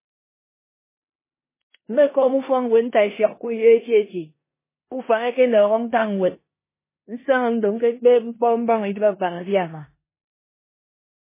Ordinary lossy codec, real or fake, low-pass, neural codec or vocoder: MP3, 16 kbps; fake; 3.6 kHz; codec, 16 kHz in and 24 kHz out, 0.9 kbps, LongCat-Audio-Codec, four codebook decoder